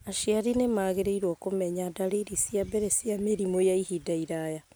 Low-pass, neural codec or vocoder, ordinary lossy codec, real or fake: none; none; none; real